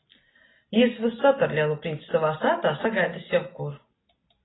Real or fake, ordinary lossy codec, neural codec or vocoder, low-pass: real; AAC, 16 kbps; none; 7.2 kHz